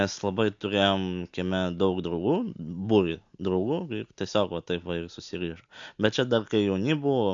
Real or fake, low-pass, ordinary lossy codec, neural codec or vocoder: real; 7.2 kHz; MP3, 64 kbps; none